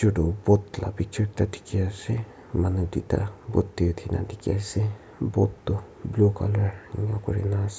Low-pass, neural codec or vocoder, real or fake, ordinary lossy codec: none; none; real; none